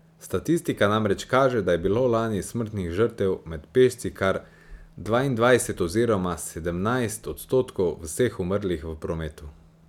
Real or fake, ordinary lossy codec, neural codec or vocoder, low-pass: real; none; none; 19.8 kHz